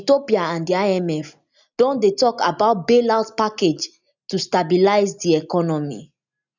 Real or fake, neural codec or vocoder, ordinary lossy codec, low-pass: real; none; none; 7.2 kHz